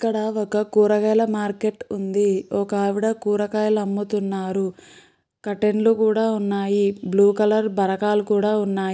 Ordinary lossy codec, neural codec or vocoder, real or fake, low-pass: none; none; real; none